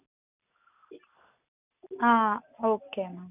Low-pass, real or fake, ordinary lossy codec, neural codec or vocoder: 3.6 kHz; real; none; none